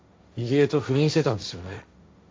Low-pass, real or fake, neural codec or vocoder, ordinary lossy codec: none; fake; codec, 16 kHz, 1.1 kbps, Voila-Tokenizer; none